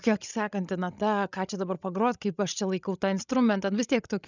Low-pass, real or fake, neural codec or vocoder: 7.2 kHz; fake; codec, 16 kHz, 16 kbps, FreqCodec, larger model